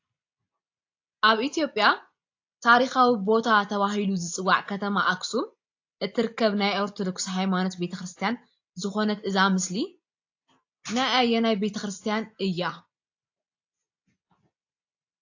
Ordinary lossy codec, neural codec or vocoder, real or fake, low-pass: AAC, 48 kbps; none; real; 7.2 kHz